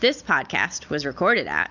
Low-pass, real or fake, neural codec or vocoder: 7.2 kHz; real; none